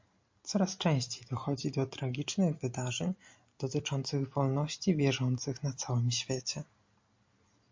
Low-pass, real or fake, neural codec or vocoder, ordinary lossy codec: 7.2 kHz; real; none; MP3, 48 kbps